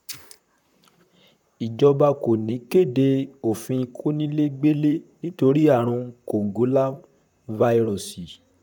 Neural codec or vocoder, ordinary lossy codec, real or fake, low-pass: vocoder, 44.1 kHz, 128 mel bands, Pupu-Vocoder; none; fake; 19.8 kHz